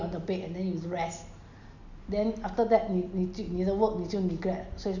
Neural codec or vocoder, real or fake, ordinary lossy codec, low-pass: none; real; none; 7.2 kHz